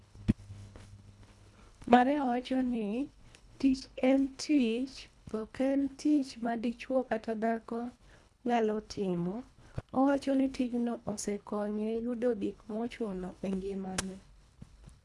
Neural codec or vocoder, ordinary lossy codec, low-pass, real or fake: codec, 24 kHz, 1.5 kbps, HILCodec; none; none; fake